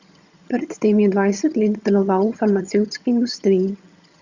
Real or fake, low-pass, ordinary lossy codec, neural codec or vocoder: fake; 7.2 kHz; Opus, 64 kbps; vocoder, 22.05 kHz, 80 mel bands, HiFi-GAN